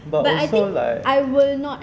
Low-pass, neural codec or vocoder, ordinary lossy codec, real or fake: none; none; none; real